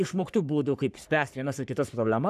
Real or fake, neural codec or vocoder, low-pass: fake; codec, 44.1 kHz, 3.4 kbps, Pupu-Codec; 14.4 kHz